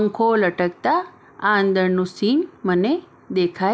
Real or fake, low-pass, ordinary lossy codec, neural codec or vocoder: real; none; none; none